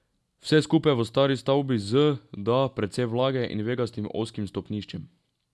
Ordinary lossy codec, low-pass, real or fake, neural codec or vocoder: none; none; real; none